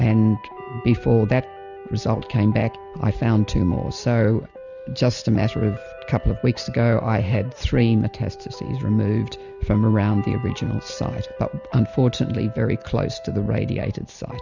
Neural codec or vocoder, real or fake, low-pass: none; real; 7.2 kHz